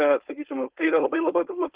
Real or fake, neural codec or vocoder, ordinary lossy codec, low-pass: fake; codec, 16 kHz, 4.8 kbps, FACodec; Opus, 16 kbps; 3.6 kHz